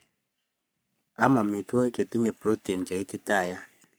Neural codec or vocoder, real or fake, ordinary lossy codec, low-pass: codec, 44.1 kHz, 3.4 kbps, Pupu-Codec; fake; none; none